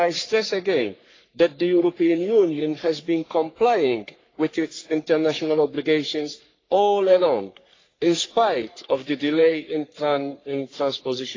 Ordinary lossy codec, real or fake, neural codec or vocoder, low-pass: AAC, 32 kbps; fake; codec, 44.1 kHz, 3.4 kbps, Pupu-Codec; 7.2 kHz